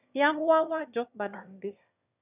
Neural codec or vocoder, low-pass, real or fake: autoencoder, 22.05 kHz, a latent of 192 numbers a frame, VITS, trained on one speaker; 3.6 kHz; fake